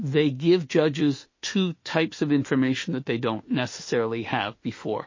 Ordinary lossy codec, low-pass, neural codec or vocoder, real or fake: MP3, 32 kbps; 7.2 kHz; autoencoder, 48 kHz, 32 numbers a frame, DAC-VAE, trained on Japanese speech; fake